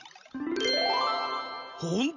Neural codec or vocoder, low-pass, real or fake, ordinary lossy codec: none; 7.2 kHz; real; none